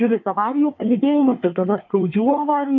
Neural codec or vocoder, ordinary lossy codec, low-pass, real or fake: codec, 24 kHz, 1 kbps, SNAC; MP3, 64 kbps; 7.2 kHz; fake